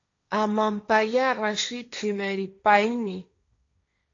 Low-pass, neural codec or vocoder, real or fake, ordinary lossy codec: 7.2 kHz; codec, 16 kHz, 1.1 kbps, Voila-Tokenizer; fake; AAC, 48 kbps